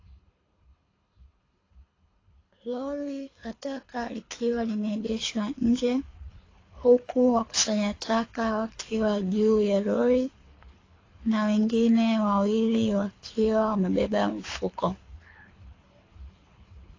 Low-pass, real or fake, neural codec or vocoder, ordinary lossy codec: 7.2 kHz; fake; codec, 24 kHz, 6 kbps, HILCodec; AAC, 32 kbps